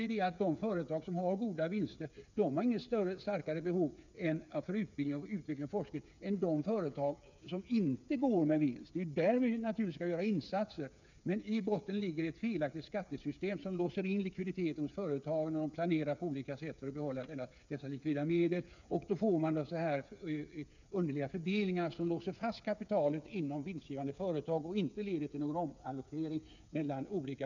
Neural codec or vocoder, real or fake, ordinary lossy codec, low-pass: codec, 16 kHz, 8 kbps, FreqCodec, smaller model; fake; none; 7.2 kHz